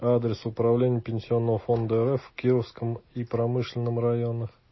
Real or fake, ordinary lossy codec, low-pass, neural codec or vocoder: real; MP3, 24 kbps; 7.2 kHz; none